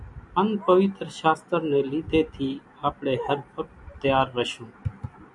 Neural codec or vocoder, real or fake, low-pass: none; real; 10.8 kHz